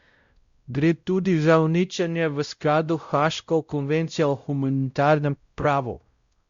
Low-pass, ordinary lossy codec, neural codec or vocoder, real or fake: 7.2 kHz; Opus, 64 kbps; codec, 16 kHz, 0.5 kbps, X-Codec, WavLM features, trained on Multilingual LibriSpeech; fake